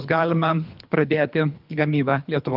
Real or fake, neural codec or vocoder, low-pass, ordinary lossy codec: fake; codec, 24 kHz, 3 kbps, HILCodec; 5.4 kHz; Opus, 32 kbps